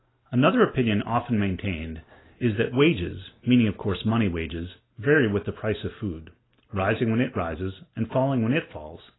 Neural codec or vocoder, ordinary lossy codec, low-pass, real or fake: none; AAC, 16 kbps; 7.2 kHz; real